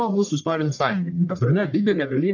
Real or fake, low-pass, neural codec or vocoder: fake; 7.2 kHz; codec, 44.1 kHz, 1.7 kbps, Pupu-Codec